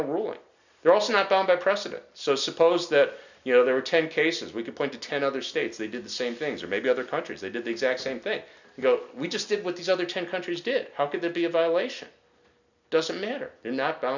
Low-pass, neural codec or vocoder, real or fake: 7.2 kHz; none; real